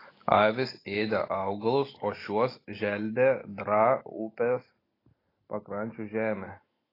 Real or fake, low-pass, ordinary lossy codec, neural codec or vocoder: real; 5.4 kHz; AAC, 24 kbps; none